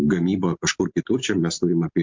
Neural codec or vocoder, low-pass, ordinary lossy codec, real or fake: none; 7.2 kHz; MP3, 64 kbps; real